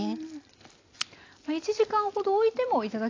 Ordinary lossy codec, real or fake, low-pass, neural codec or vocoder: AAC, 32 kbps; real; 7.2 kHz; none